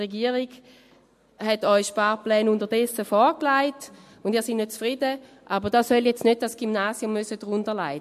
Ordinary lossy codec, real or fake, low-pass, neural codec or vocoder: MP3, 64 kbps; real; 14.4 kHz; none